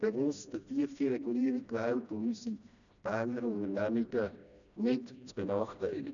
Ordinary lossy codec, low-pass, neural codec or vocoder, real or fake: none; 7.2 kHz; codec, 16 kHz, 1 kbps, FreqCodec, smaller model; fake